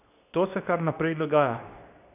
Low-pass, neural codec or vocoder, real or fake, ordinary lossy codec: 3.6 kHz; codec, 24 kHz, 0.9 kbps, WavTokenizer, medium speech release version 2; fake; none